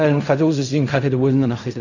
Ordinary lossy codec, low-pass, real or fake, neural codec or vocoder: none; 7.2 kHz; fake; codec, 16 kHz in and 24 kHz out, 0.4 kbps, LongCat-Audio-Codec, fine tuned four codebook decoder